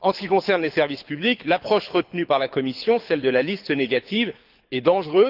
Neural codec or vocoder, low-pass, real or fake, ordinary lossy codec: codec, 24 kHz, 6 kbps, HILCodec; 5.4 kHz; fake; Opus, 32 kbps